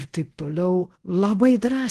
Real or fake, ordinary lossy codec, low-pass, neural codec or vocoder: fake; Opus, 16 kbps; 10.8 kHz; codec, 24 kHz, 0.5 kbps, DualCodec